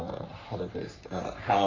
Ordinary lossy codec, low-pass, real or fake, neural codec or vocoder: AAC, 32 kbps; 7.2 kHz; fake; codec, 44.1 kHz, 3.4 kbps, Pupu-Codec